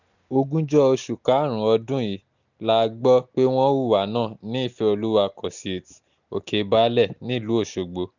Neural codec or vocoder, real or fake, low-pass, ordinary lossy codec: none; real; 7.2 kHz; none